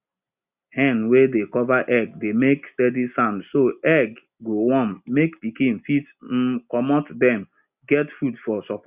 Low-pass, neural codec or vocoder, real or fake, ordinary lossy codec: 3.6 kHz; none; real; Opus, 64 kbps